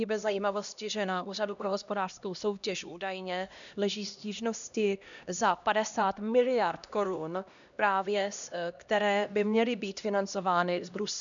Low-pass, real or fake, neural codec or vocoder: 7.2 kHz; fake; codec, 16 kHz, 1 kbps, X-Codec, HuBERT features, trained on LibriSpeech